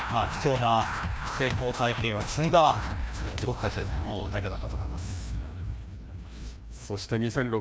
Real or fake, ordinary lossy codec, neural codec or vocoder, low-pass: fake; none; codec, 16 kHz, 1 kbps, FreqCodec, larger model; none